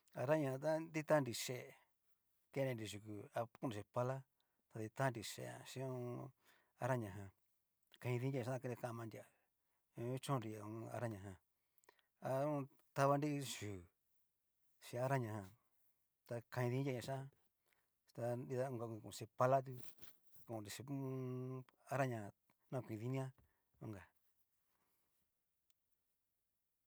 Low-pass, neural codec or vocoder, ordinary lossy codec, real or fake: none; none; none; real